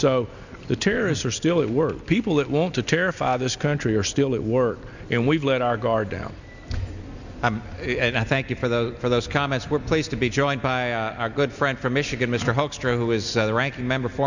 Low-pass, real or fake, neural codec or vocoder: 7.2 kHz; real; none